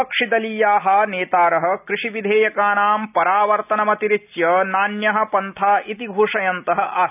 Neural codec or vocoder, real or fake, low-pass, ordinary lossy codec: none; real; 3.6 kHz; none